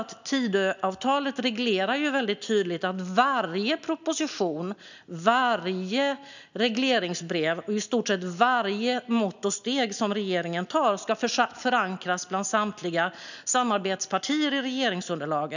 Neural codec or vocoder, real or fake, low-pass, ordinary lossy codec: none; real; 7.2 kHz; none